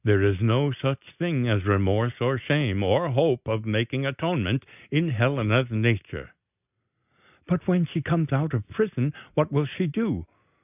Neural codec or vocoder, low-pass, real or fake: none; 3.6 kHz; real